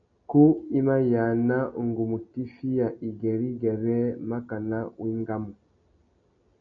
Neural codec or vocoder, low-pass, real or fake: none; 7.2 kHz; real